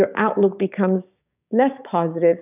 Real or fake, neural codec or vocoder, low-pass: fake; codec, 16 kHz, 6 kbps, DAC; 3.6 kHz